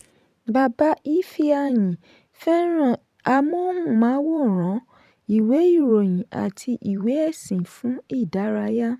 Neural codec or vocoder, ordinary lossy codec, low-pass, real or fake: vocoder, 44.1 kHz, 128 mel bands every 512 samples, BigVGAN v2; none; 14.4 kHz; fake